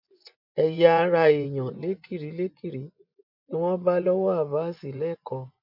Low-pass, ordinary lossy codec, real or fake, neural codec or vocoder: 5.4 kHz; none; fake; vocoder, 24 kHz, 100 mel bands, Vocos